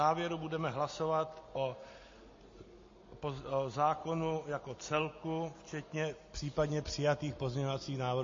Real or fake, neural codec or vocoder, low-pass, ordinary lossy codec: real; none; 7.2 kHz; MP3, 32 kbps